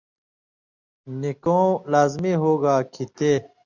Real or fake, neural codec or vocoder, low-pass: real; none; 7.2 kHz